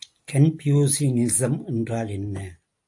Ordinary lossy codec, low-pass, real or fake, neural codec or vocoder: AAC, 64 kbps; 10.8 kHz; real; none